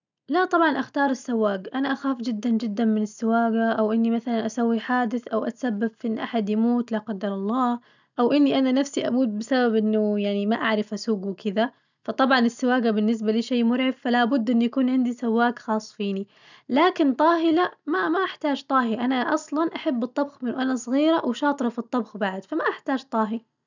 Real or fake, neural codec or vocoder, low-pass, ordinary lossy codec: real; none; 7.2 kHz; none